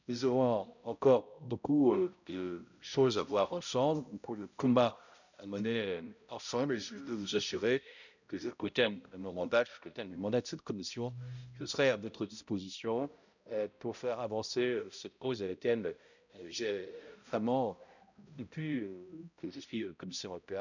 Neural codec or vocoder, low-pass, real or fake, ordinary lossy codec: codec, 16 kHz, 0.5 kbps, X-Codec, HuBERT features, trained on balanced general audio; 7.2 kHz; fake; none